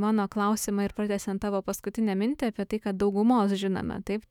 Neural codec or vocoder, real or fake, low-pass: autoencoder, 48 kHz, 128 numbers a frame, DAC-VAE, trained on Japanese speech; fake; 19.8 kHz